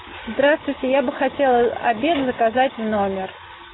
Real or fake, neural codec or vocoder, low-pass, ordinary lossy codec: real; none; 7.2 kHz; AAC, 16 kbps